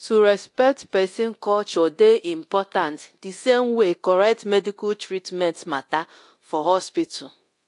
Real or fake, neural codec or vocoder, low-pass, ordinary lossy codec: fake; codec, 24 kHz, 0.9 kbps, DualCodec; 10.8 kHz; AAC, 48 kbps